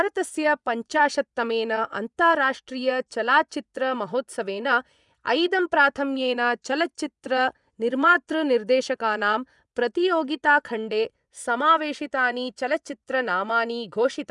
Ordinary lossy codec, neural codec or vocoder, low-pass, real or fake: none; vocoder, 24 kHz, 100 mel bands, Vocos; 10.8 kHz; fake